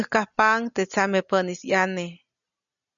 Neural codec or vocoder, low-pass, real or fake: none; 7.2 kHz; real